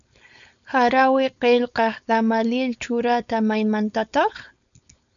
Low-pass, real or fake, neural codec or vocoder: 7.2 kHz; fake; codec, 16 kHz, 4.8 kbps, FACodec